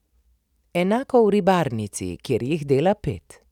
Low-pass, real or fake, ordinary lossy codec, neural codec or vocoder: 19.8 kHz; real; none; none